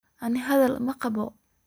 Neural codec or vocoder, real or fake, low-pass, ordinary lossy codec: none; real; none; none